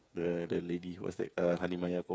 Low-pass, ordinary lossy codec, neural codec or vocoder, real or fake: none; none; codec, 16 kHz, 8 kbps, FreqCodec, smaller model; fake